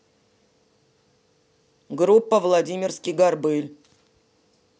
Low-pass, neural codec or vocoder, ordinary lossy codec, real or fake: none; none; none; real